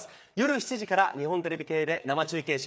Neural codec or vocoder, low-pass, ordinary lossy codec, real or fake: codec, 16 kHz, 4 kbps, FreqCodec, larger model; none; none; fake